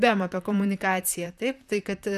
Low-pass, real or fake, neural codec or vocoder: 14.4 kHz; fake; vocoder, 44.1 kHz, 128 mel bands, Pupu-Vocoder